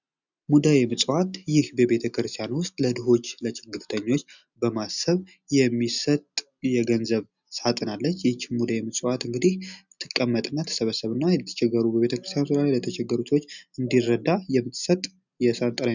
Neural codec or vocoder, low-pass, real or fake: none; 7.2 kHz; real